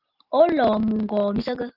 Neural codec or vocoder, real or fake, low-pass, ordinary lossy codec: none; real; 5.4 kHz; Opus, 32 kbps